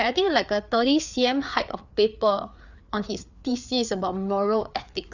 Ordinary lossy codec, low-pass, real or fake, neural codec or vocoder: none; 7.2 kHz; fake; codec, 16 kHz, 4 kbps, FreqCodec, larger model